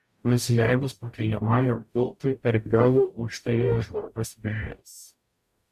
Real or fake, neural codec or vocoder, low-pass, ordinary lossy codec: fake; codec, 44.1 kHz, 0.9 kbps, DAC; 14.4 kHz; Opus, 64 kbps